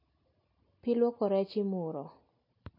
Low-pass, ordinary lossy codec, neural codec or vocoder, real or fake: 5.4 kHz; MP3, 32 kbps; none; real